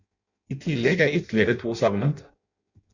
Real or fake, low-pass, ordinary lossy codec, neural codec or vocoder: fake; 7.2 kHz; Opus, 64 kbps; codec, 16 kHz in and 24 kHz out, 0.6 kbps, FireRedTTS-2 codec